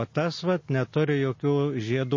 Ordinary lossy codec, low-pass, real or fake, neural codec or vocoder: MP3, 32 kbps; 7.2 kHz; real; none